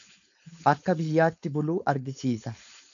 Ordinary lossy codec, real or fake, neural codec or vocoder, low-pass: MP3, 96 kbps; fake; codec, 16 kHz, 4.8 kbps, FACodec; 7.2 kHz